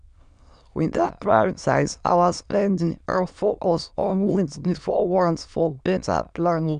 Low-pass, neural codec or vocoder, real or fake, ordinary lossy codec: 9.9 kHz; autoencoder, 22.05 kHz, a latent of 192 numbers a frame, VITS, trained on many speakers; fake; MP3, 96 kbps